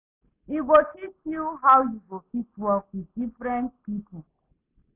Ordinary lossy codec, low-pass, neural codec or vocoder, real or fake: none; 3.6 kHz; none; real